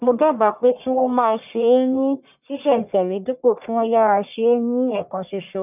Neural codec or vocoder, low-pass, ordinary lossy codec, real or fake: codec, 44.1 kHz, 1.7 kbps, Pupu-Codec; 3.6 kHz; none; fake